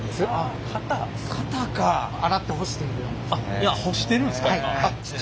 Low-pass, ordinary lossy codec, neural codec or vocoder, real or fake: none; none; none; real